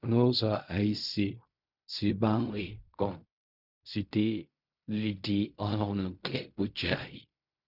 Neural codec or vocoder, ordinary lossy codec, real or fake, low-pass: codec, 16 kHz in and 24 kHz out, 0.4 kbps, LongCat-Audio-Codec, fine tuned four codebook decoder; none; fake; 5.4 kHz